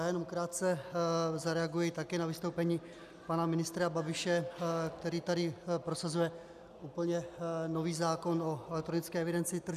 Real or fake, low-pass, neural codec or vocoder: real; 14.4 kHz; none